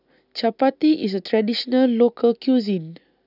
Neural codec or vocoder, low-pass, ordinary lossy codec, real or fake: none; 5.4 kHz; none; real